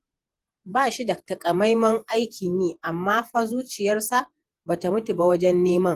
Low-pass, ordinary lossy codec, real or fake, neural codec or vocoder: 14.4 kHz; Opus, 24 kbps; fake; vocoder, 48 kHz, 128 mel bands, Vocos